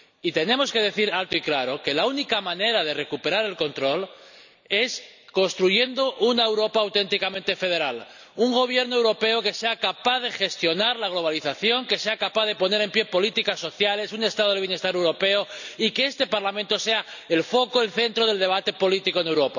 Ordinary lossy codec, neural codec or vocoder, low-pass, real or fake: none; none; 7.2 kHz; real